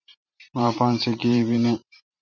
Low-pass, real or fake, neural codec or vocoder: 7.2 kHz; fake; vocoder, 44.1 kHz, 128 mel bands every 512 samples, BigVGAN v2